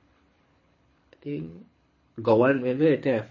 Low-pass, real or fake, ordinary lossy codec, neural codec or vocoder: 7.2 kHz; fake; MP3, 32 kbps; codec, 24 kHz, 6 kbps, HILCodec